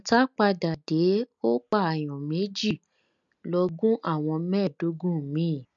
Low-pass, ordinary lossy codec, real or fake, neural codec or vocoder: 7.2 kHz; MP3, 96 kbps; real; none